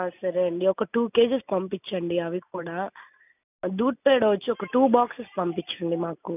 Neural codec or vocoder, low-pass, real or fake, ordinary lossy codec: none; 3.6 kHz; real; none